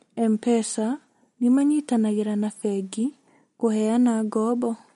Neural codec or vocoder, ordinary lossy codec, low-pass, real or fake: none; MP3, 48 kbps; 19.8 kHz; real